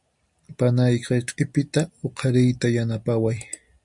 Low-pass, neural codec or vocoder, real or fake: 10.8 kHz; none; real